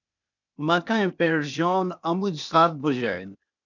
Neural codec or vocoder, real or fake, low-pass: codec, 16 kHz, 0.8 kbps, ZipCodec; fake; 7.2 kHz